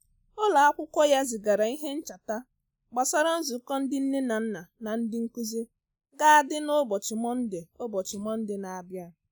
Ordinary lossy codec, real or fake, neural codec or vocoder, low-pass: none; real; none; none